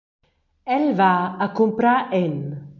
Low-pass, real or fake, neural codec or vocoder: 7.2 kHz; real; none